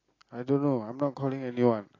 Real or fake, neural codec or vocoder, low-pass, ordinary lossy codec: real; none; 7.2 kHz; AAC, 32 kbps